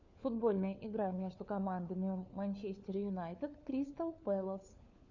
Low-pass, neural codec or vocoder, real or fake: 7.2 kHz; codec, 16 kHz, 4 kbps, FunCodec, trained on LibriTTS, 50 frames a second; fake